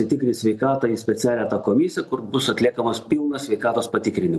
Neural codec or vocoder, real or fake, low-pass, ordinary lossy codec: none; real; 14.4 kHz; MP3, 96 kbps